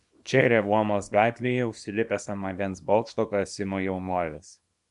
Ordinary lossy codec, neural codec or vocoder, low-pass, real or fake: MP3, 96 kbps; codec, 24 kHz, 0.9 kbps, WavTokenizer, small release; 10.8 kHz; fake